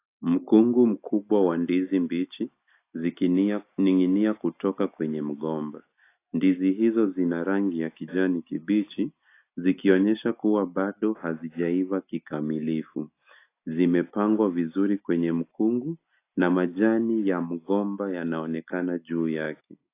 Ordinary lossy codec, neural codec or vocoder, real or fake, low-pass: AAC, 24 kbps; none; real; 3.6 kHz